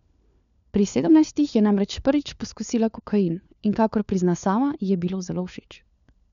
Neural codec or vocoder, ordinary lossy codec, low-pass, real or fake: codec, 16 kHz, 4 kbps, FunCodec, trained on LibriTTS, 50 frames a second; none; 7.2 kHz; fake